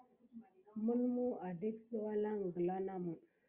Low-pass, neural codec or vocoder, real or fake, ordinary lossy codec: 3.6 kHz; none; real; Opus, 64 kbps